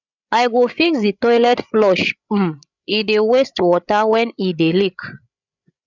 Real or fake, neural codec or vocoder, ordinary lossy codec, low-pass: fake; codec, 16 kHz, 8 kbps, FreqCodec, larger model; none; 7.2 kHz